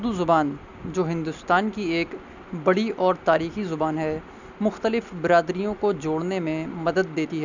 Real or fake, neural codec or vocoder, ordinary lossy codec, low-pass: real; none; none; 7.2 kHz